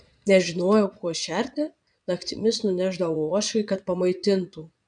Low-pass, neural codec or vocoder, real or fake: 9.9 kHz; vocoder, 22.05 kHz, 80 mel bands, Vocos; fake